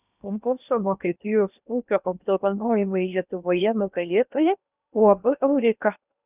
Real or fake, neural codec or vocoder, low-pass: fake; codec, 16 kHz in and 24 kHz out, 0.8 kbps, FocalCodec, streaming, 65536 codes; 3.6 kHz